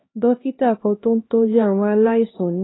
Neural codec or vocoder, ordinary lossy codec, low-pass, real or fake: codec, 16 kHz, 1 kbps, X-Codec, HuBERT features, trained on LibriSpeech; AAC, 16 kbps; 7.2 kHz; fake